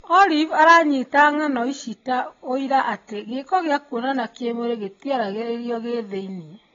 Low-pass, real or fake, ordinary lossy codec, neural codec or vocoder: 7.2 kHz; real; AAC, 24 kbps; none